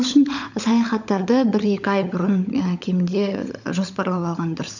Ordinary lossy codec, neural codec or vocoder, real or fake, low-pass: none; codec, 16 kHz, 16 kbps, FunCodec, trained on LibriTTS, 50 frames a second; fake; 7.2 kHz